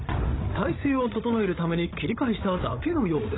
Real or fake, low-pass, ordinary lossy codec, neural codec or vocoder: fake; 7.2 kHz; AAC, 16 kbps; codec, 16 kHz, 16 kbps, FreqCodec, larger model